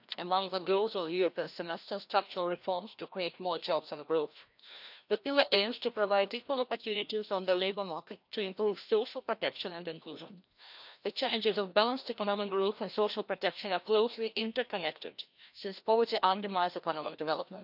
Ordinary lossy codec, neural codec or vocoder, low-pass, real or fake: none; codec, 16 kHz, 1 kbps, FreqCodec, larger model; 5.4 kHz; fake